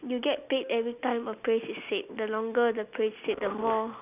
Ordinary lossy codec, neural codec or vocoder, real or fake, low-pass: none; none; real; 3.6 kHz